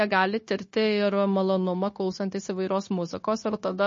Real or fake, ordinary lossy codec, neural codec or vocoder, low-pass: fake; MP3, 32 kbps; codec, 16 kHz, 0.9 kbps, LongCat-Audio-Codec; 7.2 kHz